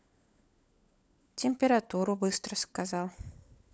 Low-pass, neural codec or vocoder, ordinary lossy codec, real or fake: none; codec, 16 kHz, 16 kbps, FunCodec, trained on LibriTTS, 50 frames a second; none; fake